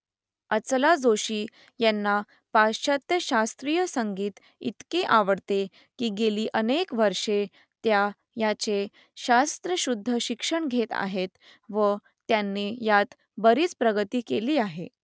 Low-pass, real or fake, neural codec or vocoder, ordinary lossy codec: none; real; none; none